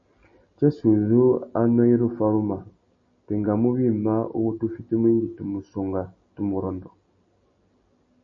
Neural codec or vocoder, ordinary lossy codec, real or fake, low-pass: none; MP3, 32 kbps; real; 7.2 kHz